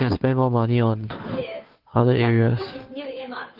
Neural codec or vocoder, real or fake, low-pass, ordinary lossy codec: codec, 16 kHz, 2 kbps, FunCodec, trained on Chinese and English, 25 frames a second; fake; 5.4 kHz; Opus, 32 kbps